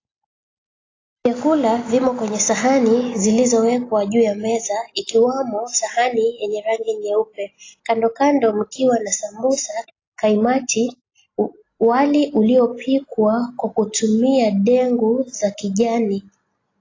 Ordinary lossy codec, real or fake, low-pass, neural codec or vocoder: AAC, 32 kbps; real; 7.2 kHz; none